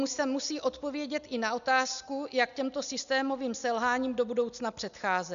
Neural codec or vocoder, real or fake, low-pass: none; real; 7.2 kHz